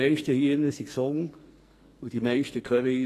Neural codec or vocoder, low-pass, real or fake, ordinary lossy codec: codec, 44.1 kHz, 2.6 kbps, SNAC; 14.4 kHz; fake; AAC, 48 kbps